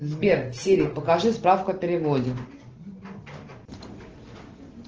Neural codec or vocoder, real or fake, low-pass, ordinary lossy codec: none; real; 7.2 kHz; Opus, 16 kbps